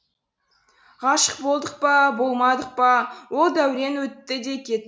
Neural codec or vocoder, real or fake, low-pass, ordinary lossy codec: none; real; none; none